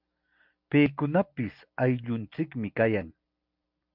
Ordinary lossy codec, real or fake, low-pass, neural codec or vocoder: MP3, 48 kbps; real; 5.4 kHz; none